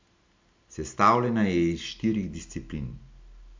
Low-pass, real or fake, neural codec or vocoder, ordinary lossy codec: 7.2 kHz; real; none; MP3, 64 kbps